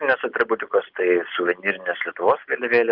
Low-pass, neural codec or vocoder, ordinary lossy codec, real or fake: 5.4 kHz; none; Opus, 24 kbps; real